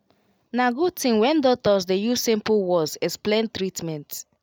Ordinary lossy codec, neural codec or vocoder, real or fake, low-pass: none; none; real; none